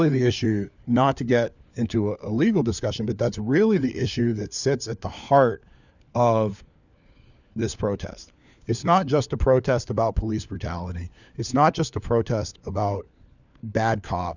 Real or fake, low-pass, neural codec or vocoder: fake; 7.2 kHz; codec, 16 kHz, 4 kbps, FunCodec, trained on LibriTTS, 50 frames a second